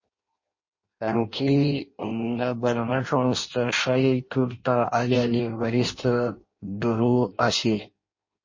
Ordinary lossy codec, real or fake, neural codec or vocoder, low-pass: MP3, 32 kbps; fake; codec, 16 kHz in and 24 kHz out, 0.6 kbps, FireRedTTS-2 codec; 7.2 kHz